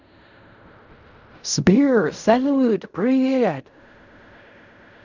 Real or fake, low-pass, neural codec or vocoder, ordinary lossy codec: fake; 7.2 kHz; codec, 16 kHz in and 24 kHz out, 0.4 kbps, LongCat-Audio-Codec, fine tuned four codebook decoder; none